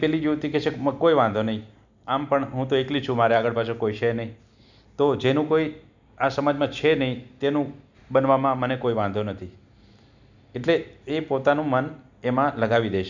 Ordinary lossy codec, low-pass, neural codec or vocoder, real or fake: none; 7.2 kHz; none; real